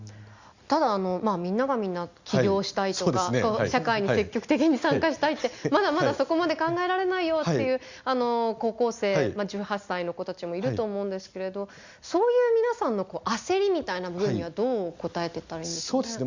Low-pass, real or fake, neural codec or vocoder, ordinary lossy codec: 7.2 kHz; real; none; Opus, 64 kbps